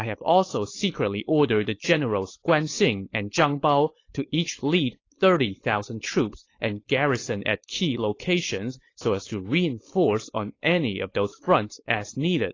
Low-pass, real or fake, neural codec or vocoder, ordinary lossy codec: 7.2 kHz; fake; codec, 16 kHz, 4.8 kbps, FACodec; AAC, 32 kbps